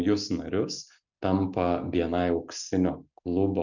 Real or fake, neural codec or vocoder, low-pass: real; none; 7.2 kHz